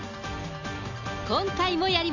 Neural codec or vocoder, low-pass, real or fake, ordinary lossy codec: none; 7.2 kHz; real; none